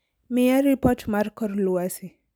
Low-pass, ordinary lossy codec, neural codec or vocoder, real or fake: none; none; none; real